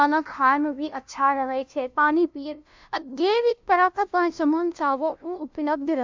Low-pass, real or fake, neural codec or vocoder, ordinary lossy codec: 7.2 kHz; fake; codec, 16 kHz, 0.5 kbps, FunCodec, trained on LibriTTS, 25 frames a second; MP3, 64 kbps